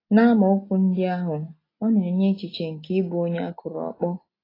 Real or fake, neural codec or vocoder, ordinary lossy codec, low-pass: real; none; AAC, 24 kbps; 5.4 kHz